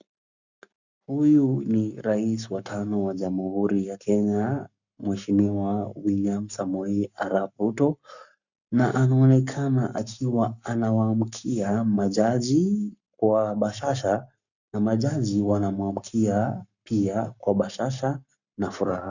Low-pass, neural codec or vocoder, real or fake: 7.2 kHz; codec, 44.1 kHz, 7.8 kbps, Pupu-Codec; fake